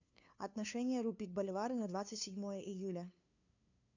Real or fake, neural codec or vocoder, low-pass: fake; codec, 16 kHz, 2 kbps, FunCodec, trained on LibriTTS, 25 frames a second; 7.2 kHz